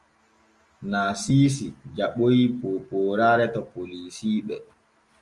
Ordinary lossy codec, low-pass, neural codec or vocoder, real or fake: Opus, 32 kbps; 10.8 kHz; none; real